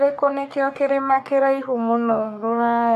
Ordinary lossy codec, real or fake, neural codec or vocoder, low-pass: AAC, 96 kbps; fake; codec, 44.1 kHz, 3.4 kbps, Pupu-Codec; 14.4 kHz